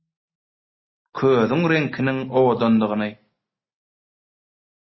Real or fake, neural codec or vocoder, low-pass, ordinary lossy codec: real; none; 7.2 kHz; MP3, 24 kbps